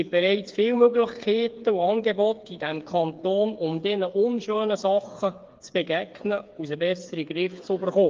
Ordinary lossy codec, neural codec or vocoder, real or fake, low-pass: Opus, 32 kbps; codec, 16 kHz, 4 kbps, FreqCodec, smaller model; fake; 7.2 kHz